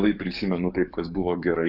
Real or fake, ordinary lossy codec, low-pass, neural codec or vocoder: fake; Opus, 64 kbps; 5.4 kHz; codec, 44.1 kHz, 7.8 kbps, DAC